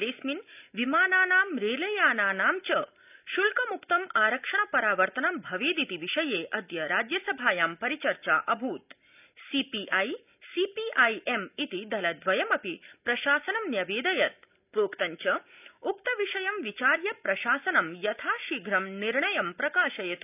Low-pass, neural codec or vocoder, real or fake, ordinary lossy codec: 3.6 kHz; none; real; none